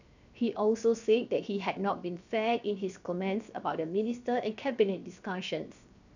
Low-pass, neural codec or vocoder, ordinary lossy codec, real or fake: 7.2 kHz; codec, 16 kHz, 0.7 kbps, FocalCodec; none; fake